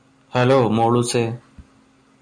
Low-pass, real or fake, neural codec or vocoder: 9.9 kHz; real; none